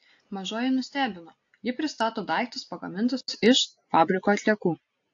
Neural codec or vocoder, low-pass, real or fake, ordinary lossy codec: none; 7.2 kHz; real; AAC, 48 kbps